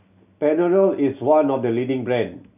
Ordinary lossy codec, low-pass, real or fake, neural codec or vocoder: none; 3.6 kHz; real; none